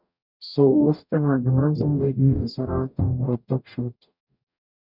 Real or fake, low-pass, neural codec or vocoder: fake; 5.4 kHz; codec, 44.1 kHz, 0.9 kbps, DAC